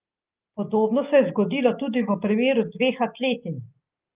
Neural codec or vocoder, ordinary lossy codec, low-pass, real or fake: none; Opus, 32 kbps; 3.6 kHz; real